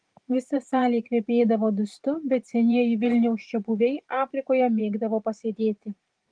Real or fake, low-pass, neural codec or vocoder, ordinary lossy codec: fake; 9.9 kHz; vocoder, 44.1 kHz, 128 mel bands every 512 samples, BigVGAN v2; Opus, 24 kbps